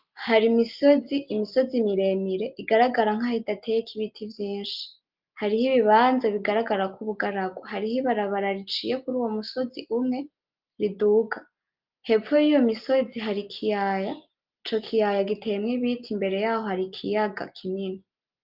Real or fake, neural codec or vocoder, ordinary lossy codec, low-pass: real; none; Opus, 32 kbps; 5.4 kHz